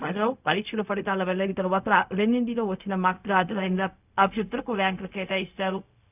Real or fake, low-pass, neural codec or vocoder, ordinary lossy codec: fake; 3.6 kHz; codec, 16 kHz, 0.4 kbps, LongCat-Audio-Codec; none